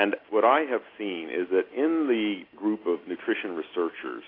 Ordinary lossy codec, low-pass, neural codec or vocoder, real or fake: AAC, 24 kbps; 5.4 kHz; none; real